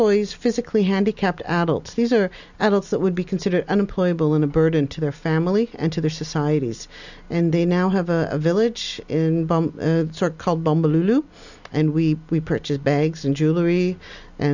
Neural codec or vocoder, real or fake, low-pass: none; real; 7.2 kHz